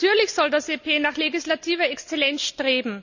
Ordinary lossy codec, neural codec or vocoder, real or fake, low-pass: none; none; real; 7.2 kHz